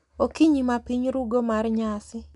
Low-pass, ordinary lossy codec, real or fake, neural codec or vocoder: 10.8 kHz; none; real; none